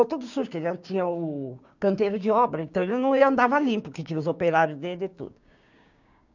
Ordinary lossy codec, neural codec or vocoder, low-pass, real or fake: none; codec, 16 kHz, 6 kbps, DAC; 7.2 kHz; fake